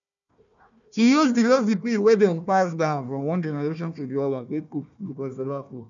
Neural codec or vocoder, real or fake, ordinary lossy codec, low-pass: codec, 16 kHz, 1 kbps, FunCodec, trained on Chinese and English, 50 frames a second; fake; none; 7.2 kHz